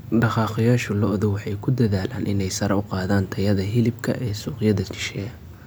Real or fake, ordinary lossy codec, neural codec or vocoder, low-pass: real; none; none; none